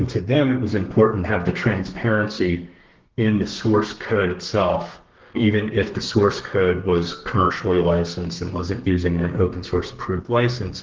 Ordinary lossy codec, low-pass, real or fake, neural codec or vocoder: Opus, 16 kbps; 7.2 kHz; fake; codec, 32 kHz, 1.9 kbps, SNAC